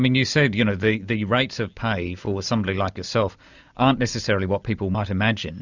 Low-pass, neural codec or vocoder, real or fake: 7.2 kHz; none; real